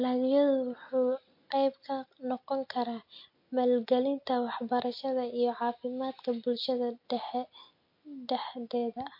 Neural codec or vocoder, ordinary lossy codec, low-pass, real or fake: none; MP3, 32 kbps; 5.4 kHz; real